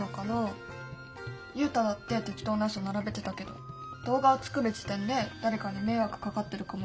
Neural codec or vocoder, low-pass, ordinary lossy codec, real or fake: none; none; none; real